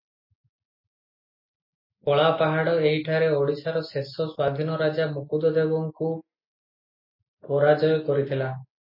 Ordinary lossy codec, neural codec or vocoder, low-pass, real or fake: MP3, 24 kbps; none; 5.4 kHz; real